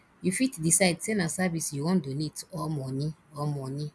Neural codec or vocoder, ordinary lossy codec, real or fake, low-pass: none; none; real; none